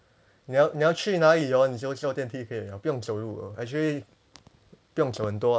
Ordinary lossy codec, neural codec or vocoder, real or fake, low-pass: none; none; real; none